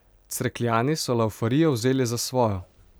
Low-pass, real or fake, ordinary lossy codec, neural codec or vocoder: none; fake; none; vocoder, 44.1 kHz, 128 mel bands every 256 samples, BigVGAN v2